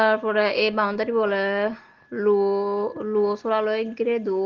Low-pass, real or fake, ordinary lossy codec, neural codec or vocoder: 7.2 kHz; real; Opus, 16 kbps; none